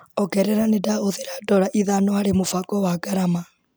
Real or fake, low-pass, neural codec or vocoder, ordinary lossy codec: real; none; none; none